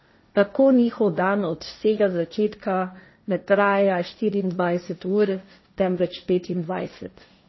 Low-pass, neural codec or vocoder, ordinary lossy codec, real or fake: 7.2 kHz; codec, 16 kHz, 1.1 kbps, Voila-Tokenizer; MP3, 24 kbps; fake